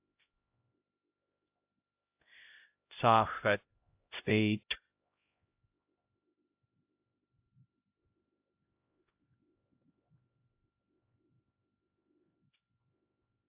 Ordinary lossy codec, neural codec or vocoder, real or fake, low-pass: AAC, 32 kbps; codec, 16 kHz, 0.5 kbps, X-Codec, HuBERT features, trained on LibriSpeech; fake; 3.6 kHz